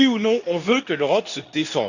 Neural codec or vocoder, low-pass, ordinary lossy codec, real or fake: codec, 24 kHz, 0.9 kbps, WavTokenizer, medium speech release version 2; 7.2 kHz; none; fake